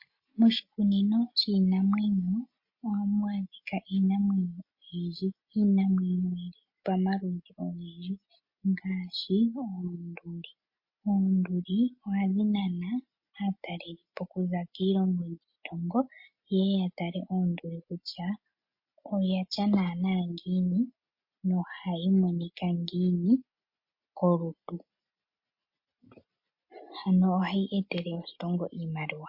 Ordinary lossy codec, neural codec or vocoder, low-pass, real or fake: MP3, 32 kbps; none; 5.4 kHz; real